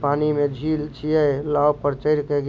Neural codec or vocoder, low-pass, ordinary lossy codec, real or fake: none; none; none; real